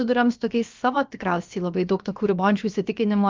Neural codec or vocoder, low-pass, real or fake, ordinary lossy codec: codec, 16 kHz, about 1 kbps, DyCAST, with the encoder's durations; 7.2 kHz; fake; Opus, 24 kbps